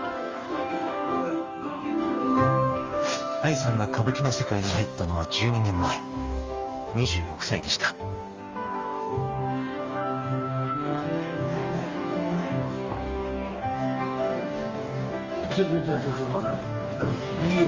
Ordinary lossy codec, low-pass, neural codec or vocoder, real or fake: Opus, 32 kbps; 7.2 kHz; codec, 44.1 kHz, 2.6 kbps, DAC; fake